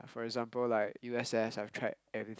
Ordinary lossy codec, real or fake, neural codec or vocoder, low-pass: none; real; none; none